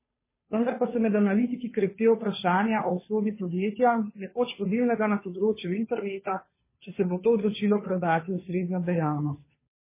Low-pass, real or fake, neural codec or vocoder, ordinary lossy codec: 3.6 kHz; fake; codec, 16 kHz, 2 kbps, FunCodec, trained on Chinese and English, 25 frames a second; MP3, 16 kbps